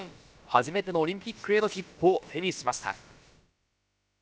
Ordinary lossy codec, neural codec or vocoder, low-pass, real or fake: none; codec, 16 kHz, about 1 kbps, DyCAST, with the encoder's durations; none; fake